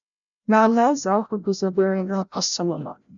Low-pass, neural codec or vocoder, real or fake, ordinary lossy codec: 7.2 kHz; codec, 16 kHz, 0.5 kbps, FreqCodec, larger model; fake; none